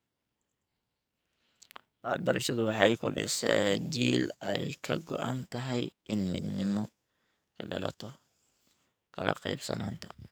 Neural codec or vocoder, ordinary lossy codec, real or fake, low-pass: codec, 44.1 kHz, 2.6 kbps, SNAC; none; fake; none